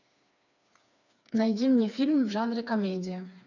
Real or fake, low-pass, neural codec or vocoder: fake; 7.2 kHz; codec, 16 kHz, 4 kbps, FreqCodec, smaller model